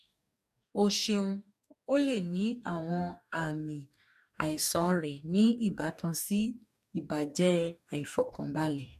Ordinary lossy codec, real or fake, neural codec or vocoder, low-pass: MP3, 96 kbps; fake; codec, 44.1 kHz, 2.6 kbps, DAC; 14.4 kHz